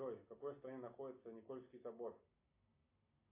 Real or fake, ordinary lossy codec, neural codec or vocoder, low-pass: real; AAC, 32 kbps; none; 3.6 kHz